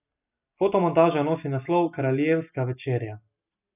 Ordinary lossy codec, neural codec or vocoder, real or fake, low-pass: none; none; real; 3.6 kHz